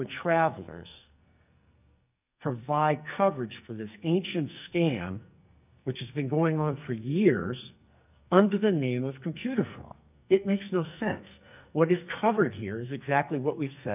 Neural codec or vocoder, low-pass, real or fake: codec, 44.1 kHz, 2.6 kbps, SNAC; 3.6 kHz; fake